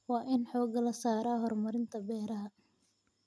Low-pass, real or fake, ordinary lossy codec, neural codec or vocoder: none; real; none; none